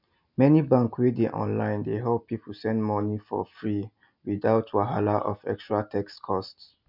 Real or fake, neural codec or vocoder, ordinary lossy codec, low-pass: real; none; none; 5.4 kHz